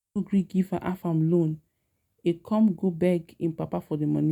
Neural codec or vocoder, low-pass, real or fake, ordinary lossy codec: none; 19.8 kHz; real; none